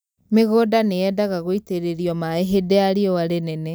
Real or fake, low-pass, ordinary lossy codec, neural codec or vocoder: real; none; none; none